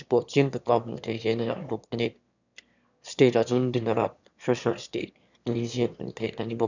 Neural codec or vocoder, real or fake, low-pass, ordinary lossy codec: autoencoder, 22.05 kHz, a latent of 192 numbers a frame, VITS, trained on one speaker; fake; 7.2 kHz; none